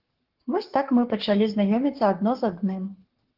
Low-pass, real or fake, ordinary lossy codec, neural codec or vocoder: 5.4 kHz; fake; Opus, 16 kbps; codec, 44.1 kHz, 7.8 kbps, Pupu-Codec